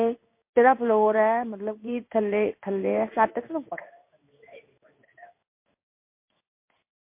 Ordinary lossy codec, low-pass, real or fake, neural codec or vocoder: MP3, 24 kbps; 3.6 kHz; fake; codec, 16 kHz in and 24 kHz out, 1 kbps, XY-Tokenizer